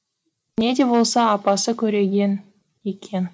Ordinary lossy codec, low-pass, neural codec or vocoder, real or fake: none; none; none; real